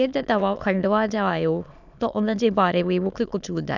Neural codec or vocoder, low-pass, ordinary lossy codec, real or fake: autoencoder, 22.05 kHz, a latent of 192 numbers a frame, VITS, trained on many speakers; 7.2 kHz; none; fake